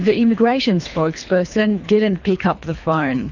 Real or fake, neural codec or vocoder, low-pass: fake; codec, 24 kHz, 3 kbps, HILCodec; 7.2 kHz